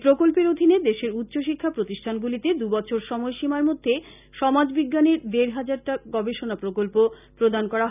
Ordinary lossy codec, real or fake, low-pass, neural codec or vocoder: none; real; 3.6 kHz; none